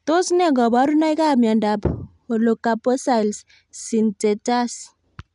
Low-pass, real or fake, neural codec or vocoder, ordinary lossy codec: 10.8 kHz; real; none; none